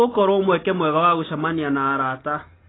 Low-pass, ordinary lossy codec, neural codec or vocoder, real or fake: 7.2 kHz; AAC, 16 kbps; none; real